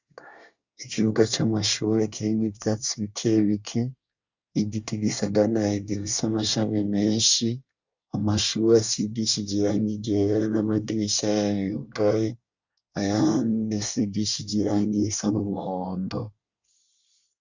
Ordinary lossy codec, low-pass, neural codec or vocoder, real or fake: Opus, 64 kbps; 7.2 kHz; codec, 24 kHz, 1 kbps, SNAC; fake